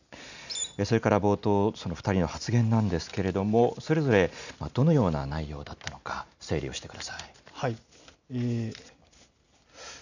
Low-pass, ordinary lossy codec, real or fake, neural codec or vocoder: 7.2 kHz; none; real; none